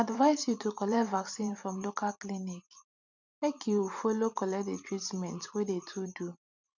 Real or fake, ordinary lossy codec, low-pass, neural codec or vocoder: fake; none; 7.2 kHz; vocoder, 44.1 kHz, 128 mel bands every 512 samples, BigVGAN v2